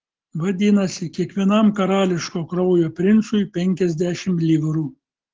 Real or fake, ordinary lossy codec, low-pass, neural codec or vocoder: real; Opus, 16 kbps; 7.2 kHz; none